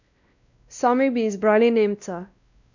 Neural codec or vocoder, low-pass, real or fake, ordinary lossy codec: codec, 16 kHz, 1 kbps, X-Codec, WavLM features, trained on Multilingual LibriSpeech; 7.2 kHz; fake; none